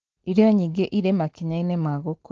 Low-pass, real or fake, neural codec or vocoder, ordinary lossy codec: 7.2 kHz; real; none; Opus, 16 kbps